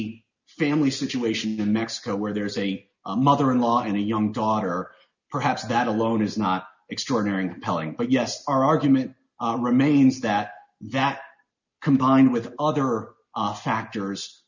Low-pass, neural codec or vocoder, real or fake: 7.2 kHz; none; real